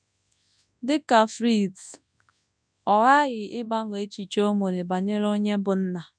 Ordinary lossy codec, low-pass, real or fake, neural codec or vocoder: none; 9.9 kHz; fake; codec, 24 kHz, 0.9 kbps, WavTokenizer, large speech release